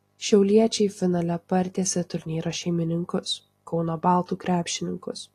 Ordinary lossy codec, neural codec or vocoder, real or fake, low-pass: AAC, 48 kbps; none; real; 14.4 kHz